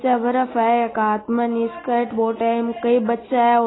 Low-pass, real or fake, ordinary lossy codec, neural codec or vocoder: 7.2 kHz; real; AAC, 16 kbps; none